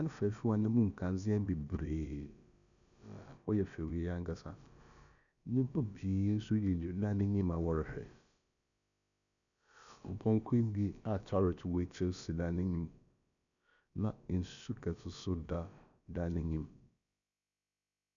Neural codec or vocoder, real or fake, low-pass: codec, 16 kHz, about 1 kbps, DyCAST, with the encoder's durations; fake; 7.2 kHz